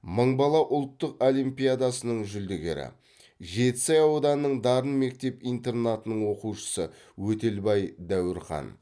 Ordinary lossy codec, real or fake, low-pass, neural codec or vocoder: none; real; none; none